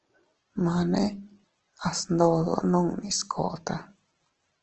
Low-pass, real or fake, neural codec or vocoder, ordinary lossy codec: 7.2 kHz; real; none; Opus, 16 kbps